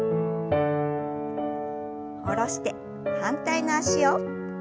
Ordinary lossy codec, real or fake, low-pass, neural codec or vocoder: none; real; none; none